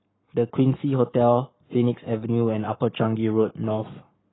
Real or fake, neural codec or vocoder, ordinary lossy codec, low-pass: fake; codec, 44.1 kHz, 7.8 kbps, Pupu-Codec; AAC, 16 kbps; 7.2 kHz